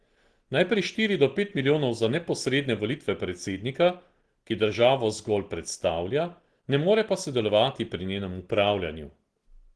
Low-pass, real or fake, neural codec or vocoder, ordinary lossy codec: 10.8 kHz; real; none; Opus, 16 kbps